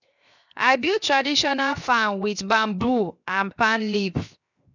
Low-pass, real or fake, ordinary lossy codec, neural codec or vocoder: 7.2 kHz; fake; none; codec, 16 kHz, 0.7 kbps, FocalCodec